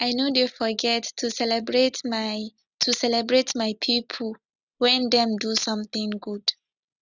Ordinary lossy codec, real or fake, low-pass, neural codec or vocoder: none; real; 7.2 kHz; none